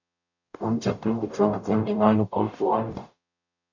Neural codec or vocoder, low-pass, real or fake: codec, 44.1 kHz, 0.9 kbps, DAC; 7.2 kHz; fake